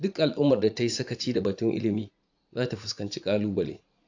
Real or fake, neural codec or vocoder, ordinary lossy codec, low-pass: fake; vocoder, 44.1 kHz, 80 mel bands, Vocos; AAC, 48 kbps; 7.2 kHz